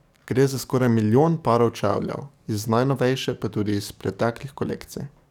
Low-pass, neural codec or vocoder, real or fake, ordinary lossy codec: 19.8 kHz; codec, 44.1 kHz, 7.8 kbps, DAC; fake; none